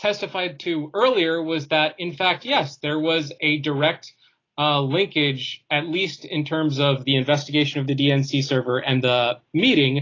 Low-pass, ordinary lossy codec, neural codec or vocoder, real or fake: 7.2 kHz; AAC, 32 kbps; none; real